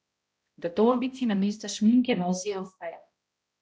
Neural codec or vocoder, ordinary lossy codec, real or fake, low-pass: codec, 16 kHz, 0.5 kbps, X-Codec, HuBERT features, trained on balanced general audio; none; fake; none